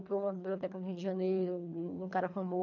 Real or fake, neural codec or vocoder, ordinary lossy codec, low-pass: fake; codec, 24 kHz, 3 kbps, HILCodec; none; 7.2 kHz